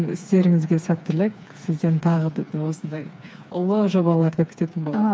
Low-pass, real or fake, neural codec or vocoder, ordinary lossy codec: none; fake; codec, 16 kHz, 4 kbps, FreqCodec, smaller model; none